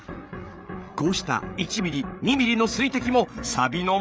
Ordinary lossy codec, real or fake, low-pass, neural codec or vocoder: none; fake; none; codec, 16 kHz, 8 kbps, FreqCodec, larger model